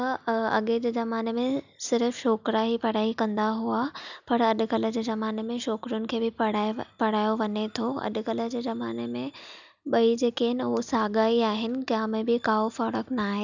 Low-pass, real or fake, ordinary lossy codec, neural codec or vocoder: 7.2 kHz; real; none; none